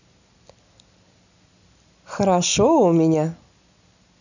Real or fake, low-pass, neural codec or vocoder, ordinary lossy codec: real; 7.2 kHz; none; none